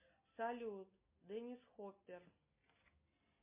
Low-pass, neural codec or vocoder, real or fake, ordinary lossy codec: 3.6 kHz; none; real; MP3, 24 kbps